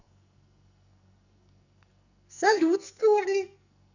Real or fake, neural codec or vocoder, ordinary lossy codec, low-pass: fake; codec, 44.1 kHz, 2.6 kbps, SNAC; none; 7.2 kHz